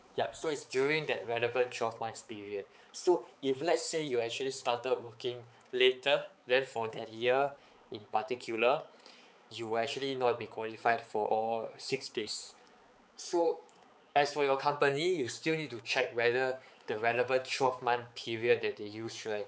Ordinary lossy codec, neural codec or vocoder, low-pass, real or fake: none; codec, 16 kHz, 4 kbps, X-Codec, HuBERT features, trained on balanced general audio; none; fake